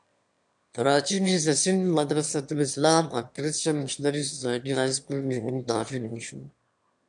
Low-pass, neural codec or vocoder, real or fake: 9.9 kHz; autoencoder, 22.05 kHz, a latent of 192 numbers a frame, VITS, trained on one speaker; fake